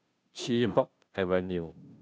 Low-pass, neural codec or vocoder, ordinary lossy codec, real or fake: none; codec, 16 kHz, 0.5 kbps, FunCodec, trained on Chinese and English, 25 frames a second; none; fake